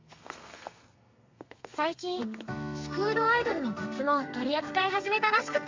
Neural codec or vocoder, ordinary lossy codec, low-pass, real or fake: codec, 32 kHz, 1.9 kbps, SNAC; MP3, 64 kbps; 7.2 kHz; fake